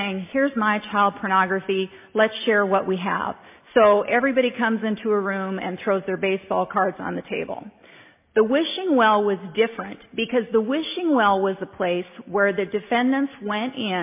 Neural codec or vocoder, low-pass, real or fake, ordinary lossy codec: none; 3.6 kHz; real; MP3, 32 kbps